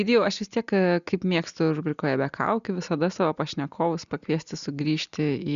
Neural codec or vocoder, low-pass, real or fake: none; 7.2 kHz; real